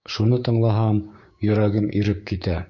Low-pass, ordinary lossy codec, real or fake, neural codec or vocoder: 7.2 kHz; MP3, 48 kbps; fake; codec, 16 kHz, 6 kbps, DAC